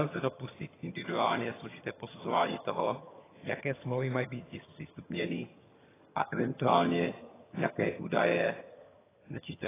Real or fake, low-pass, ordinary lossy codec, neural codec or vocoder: fake; 3.6 kHz; AAC, 16 kbps; vocoder, 22.05 kHz, 80 mel bands, HiFi-GAN